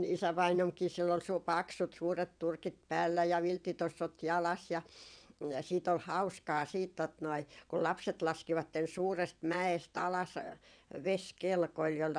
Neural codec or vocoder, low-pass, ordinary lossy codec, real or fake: vocoder, 22.05 kHz, 80 mel bands, WaveNeXt; 9.9 kHz; none; fake